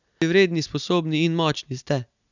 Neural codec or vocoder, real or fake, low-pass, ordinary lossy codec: none; real; 7.2 kHz; none